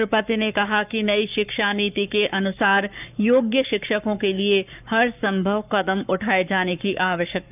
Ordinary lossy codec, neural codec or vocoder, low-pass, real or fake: none; codec, 44.1 kHz, 7.8 kbps, Pupu-Codec; 3.6 kHz; fake